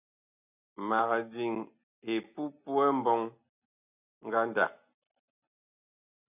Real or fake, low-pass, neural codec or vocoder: real; 3.6 kHz; none